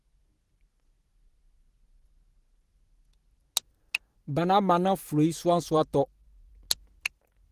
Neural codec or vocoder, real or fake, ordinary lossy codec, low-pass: vocoder, 44.1 kHz, 128 mel bands every 512 samples, BigVGAN v2; fake; Opus, 16 kbps; 14.4 kHz